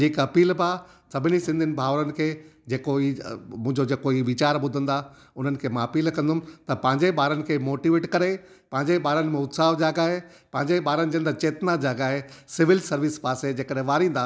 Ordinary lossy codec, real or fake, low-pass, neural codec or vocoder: none; real; none; none